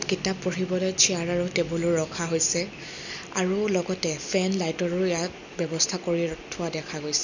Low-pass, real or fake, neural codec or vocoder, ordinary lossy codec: 7.2 kHz; real; none; none